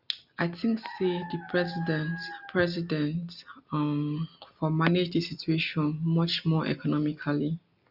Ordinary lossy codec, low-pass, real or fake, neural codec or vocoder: none; 5.4 kHz; real; none